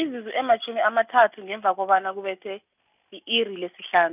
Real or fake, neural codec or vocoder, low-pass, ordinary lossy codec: real; none; 3.6 kHz; none